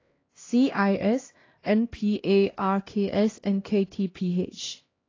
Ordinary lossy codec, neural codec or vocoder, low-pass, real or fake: AAC, 32 kbps; codec, 16 kHz, 1 kbps, X-Codec, HuBERT features, trained on LibriSpeech; 7.2 kHz; fake